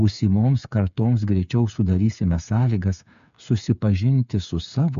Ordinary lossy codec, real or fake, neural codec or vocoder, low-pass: MP3, 64 kbps; fake; codec, 16 kHz, 8 kbps, FreqCodec, smaller model; 7.2 kHz